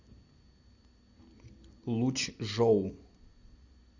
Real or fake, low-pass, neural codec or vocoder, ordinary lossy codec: real; 7.2 kHz; none; Opus, 64 kbps